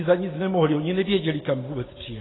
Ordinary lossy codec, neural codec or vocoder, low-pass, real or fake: AAC, 16 kbps; none; 7.2 kHz; real